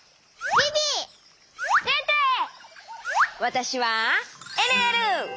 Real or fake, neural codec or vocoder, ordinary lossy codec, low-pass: real; none; none; none